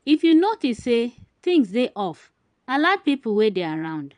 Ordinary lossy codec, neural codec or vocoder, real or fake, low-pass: none; vocoder, 22.05 kHz, 80 mel bands, Vocos; fake; 9.9 kHz